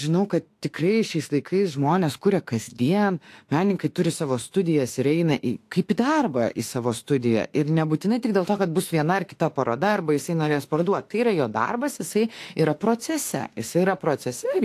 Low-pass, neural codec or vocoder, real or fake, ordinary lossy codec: 14.4 kHz; autoencoder, 48 kHz, 32 numbers a frame, DAC-VAE, trained on Japanese speech; fake; AAC, 64 kbps